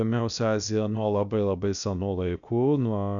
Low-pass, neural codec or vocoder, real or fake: 7.2 kHz; codec, 16 kHz, about 1 kbps, DyCAST, with the encoder's durations; fake